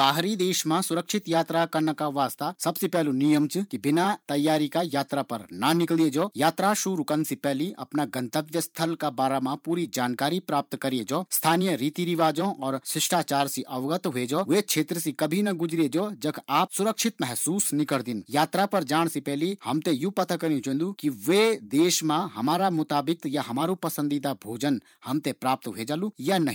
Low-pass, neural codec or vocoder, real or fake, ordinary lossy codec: none; vocoder, 44.1 kHz, 128 mel bands every 512 samples, BigVGAN v2; fake; none